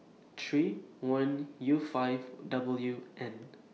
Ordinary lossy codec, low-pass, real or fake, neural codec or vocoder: none; none; real; none